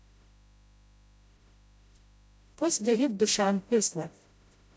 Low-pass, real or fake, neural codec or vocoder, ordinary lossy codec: none; fake; codec, 16 kHz, 0.5 kbps, FreqCodec, smaller model; none